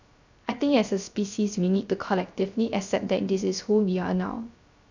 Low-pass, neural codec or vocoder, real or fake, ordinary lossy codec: 7.2 kHz; codec, 16 kHz, 0.3 kbps, FocalCodec; fake; none